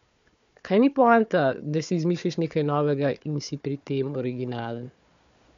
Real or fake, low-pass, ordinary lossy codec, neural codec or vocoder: fake; 7.2 kHz; MP3, 64 kbps; codec, 16 kHz, 4 kbps, FunCodec, trained on Chinese and English, 50 frames a second